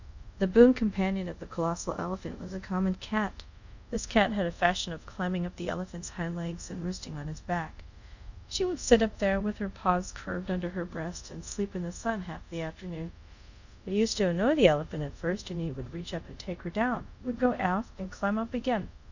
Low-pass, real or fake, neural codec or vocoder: 7.2 kHz; fake; codec, 24 kHz, 0.5 kbps, DualCodec